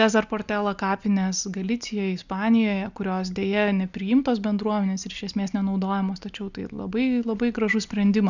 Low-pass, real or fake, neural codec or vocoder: 7.2 kHz; real; none